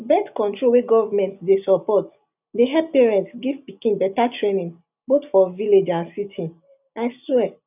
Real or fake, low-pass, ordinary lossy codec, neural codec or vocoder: real; 3.6 kHz; none; none